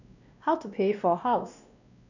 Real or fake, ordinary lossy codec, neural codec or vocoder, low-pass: fake; none; codec, 16 kHz, 1 kbps, X-Codec, WavLM features, trained on Multilingual LibriSpeech; 7.2 kHz